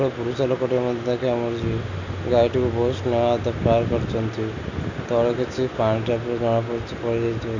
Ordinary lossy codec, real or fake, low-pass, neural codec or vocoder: none; real; 7.2 kHz; none